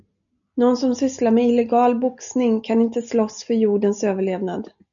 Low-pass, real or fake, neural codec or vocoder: 7.2 kHz; real; none